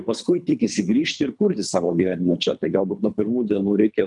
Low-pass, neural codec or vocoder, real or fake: 10.8 kHz; codec, 24 kHz, 3 kbps, HILCodec; fake